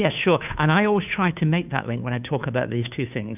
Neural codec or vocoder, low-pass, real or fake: codec, 16 kHz, 2 kbps, FunCodec, trained on Chinese and English, 25 frames a second; 3.6 kHz; fake